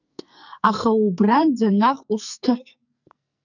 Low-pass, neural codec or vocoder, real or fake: 7.2 kHz; codec, 44.1 kHz, 2.6 kbps, SNAC; fake